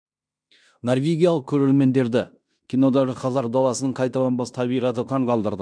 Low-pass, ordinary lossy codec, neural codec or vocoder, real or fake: 9.9 kHz; none; codec, 16 kHz in and 24 kHz out, 0.9 kbps, LongCat-Audio-Codec, fine tuned four codebook decoder; fake